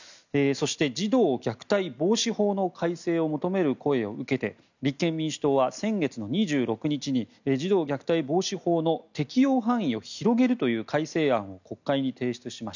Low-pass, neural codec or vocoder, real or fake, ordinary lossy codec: 7.2 kHz; none; real; none